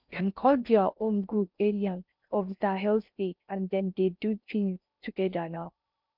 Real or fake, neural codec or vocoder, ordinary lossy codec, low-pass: fake; codec, 16 kHz in and 24 kHz out, 0.6 kbps, FocalCodec, streaming, 4096 codes; none; 5.4 kHz